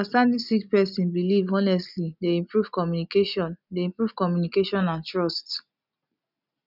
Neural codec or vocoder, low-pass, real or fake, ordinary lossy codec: none; 5.4 kHz; real; none